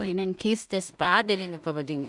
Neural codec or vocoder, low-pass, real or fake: codec, 16 kHz in and 24 kHz out, 0.4 kbps, LongCat-Audio-Codec, two codebook decoder; 10.8 kHz; fake